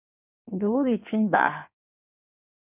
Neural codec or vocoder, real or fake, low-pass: codec, 16 kHz in and 24 kHz out, 1.1 kbps, FireRedTTS-2 codec; fake; 3.6 kHz